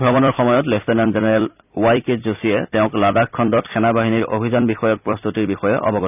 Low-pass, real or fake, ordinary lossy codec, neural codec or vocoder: 3.6 kHz; fake; none; vocoder, 44.1 kHz, 128 mel bands every 256 samples, BigVGAN v2